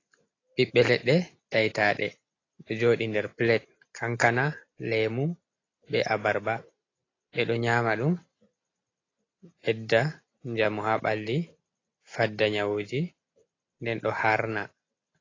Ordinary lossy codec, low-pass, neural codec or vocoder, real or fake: AAC, 32 kbps; 7.2 kHz; none; real